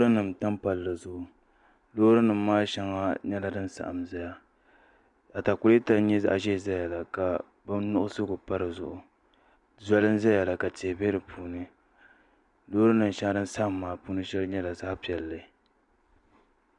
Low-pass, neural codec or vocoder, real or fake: 10.8 kHz; none; real